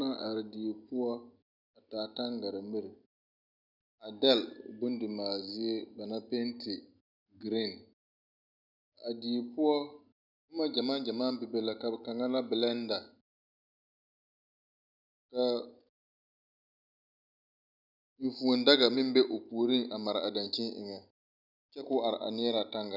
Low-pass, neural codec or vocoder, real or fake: 5.4 kHz; none; real